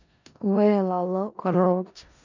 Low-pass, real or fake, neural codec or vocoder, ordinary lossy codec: 7.2 kHz; fake; codec, 16 kHz in and 24 kHz out, 0.4 kbps, LongCat-Audio-Codec, four codebook decoder; none